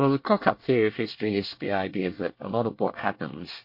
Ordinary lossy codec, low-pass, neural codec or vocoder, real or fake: MP3, 32 kbps; 5.4 kHz; codec, 24 kHz, 1 kbps, SNAC; fake